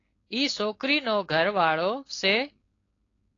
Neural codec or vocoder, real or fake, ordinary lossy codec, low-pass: codec, 16 kHz, 4.8 kbps, FACodec; fake; AAC, 32 kbps; 7.2 kHz